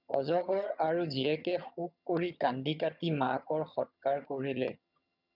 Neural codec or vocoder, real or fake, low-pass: vocoder, 22.05 kHz, 80 mel bands, HiFi-GAN; fake; 5.4 kHz